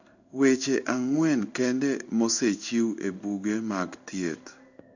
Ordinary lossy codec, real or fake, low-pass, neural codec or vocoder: none; fake; 7.2 kHz; codec, 16 kHz in and 24 kHz out, 1 kbps, XY-Tokenizer